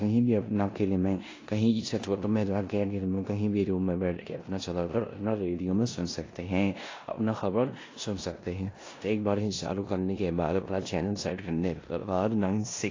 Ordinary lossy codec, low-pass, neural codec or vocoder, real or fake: AAC, 32 kbps; 7.2 kHz; codec, 16 kHz in and 24 kHz out, 0.9 kbps, LongCat-Audio-Codec, four codebook decoder; fake